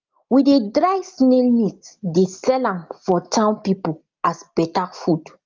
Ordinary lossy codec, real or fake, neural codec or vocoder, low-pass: Opus, 32 kbps; real; none; 7.2 kHz